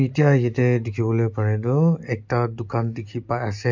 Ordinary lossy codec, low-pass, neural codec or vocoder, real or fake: MP3, 64 kbps; 7.2 kHz; none; real